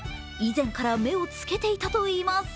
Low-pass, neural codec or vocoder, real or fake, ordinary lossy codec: none; none; real; none